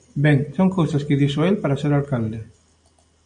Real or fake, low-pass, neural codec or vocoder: real; 9.9 kHz; none